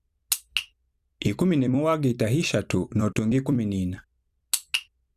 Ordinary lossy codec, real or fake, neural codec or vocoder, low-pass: Opus, 64 kbps; fake; vocoder, 44.1 kHz, 128 mel bands every 256 samples, BigVGAN v2; 14.4 kHz